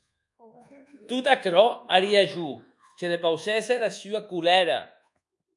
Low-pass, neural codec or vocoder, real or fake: 10.8 kHz; codec, 24 kHz, 1.2 kbps, DualCodec; fake